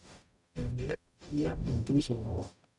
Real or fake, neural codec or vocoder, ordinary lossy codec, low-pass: fake; codec, 44.1 kHz, 0.9 kbps, DAC; none; 10.8 kHz